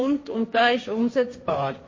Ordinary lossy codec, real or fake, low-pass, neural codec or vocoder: MP3, 32 kbps; fake; 7.2 kHz; codec, 16 kHz, 1.1 kbps, Voila-Tokenizer